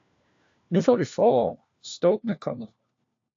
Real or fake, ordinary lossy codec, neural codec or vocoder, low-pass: fake; AAC, 48 kbps; codec, 16 kHz, 1 kbps, FunCodec, trained on LibriTTS, 50 frames a second; 7.2 kHz